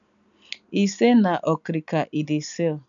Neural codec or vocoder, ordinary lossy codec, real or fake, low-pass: none; none; real; 7.2 kHz